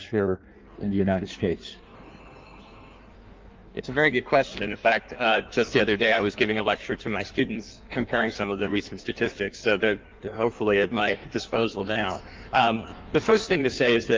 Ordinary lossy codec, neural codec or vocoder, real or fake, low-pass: Opus, 32 kbps; codec, 16 kHz in and 24 kHz out, 1.1 kbps, FireRedTTS-2 codec; fake; 7.2 kHz